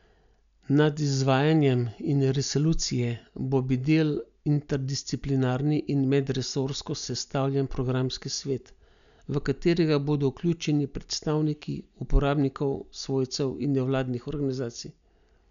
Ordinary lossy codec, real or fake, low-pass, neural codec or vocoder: none; real; 7.2 kHz; none